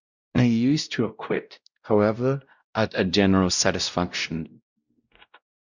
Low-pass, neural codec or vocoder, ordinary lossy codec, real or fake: 7.2 kHz; codec, 16 kHz, 0.5 kbps, X-Codec, WavLM features, trained on Multilingual LibriSpeech; Opus, 64 kbps; fake